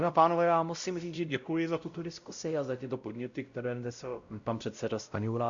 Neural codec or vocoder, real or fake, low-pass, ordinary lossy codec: codec, 16 kHz, 0.5 kbps, X-Codec, WavLM features, trained on Multilingual LibriSpeech; fake; 7.2 kHz; Opus, 64 kbps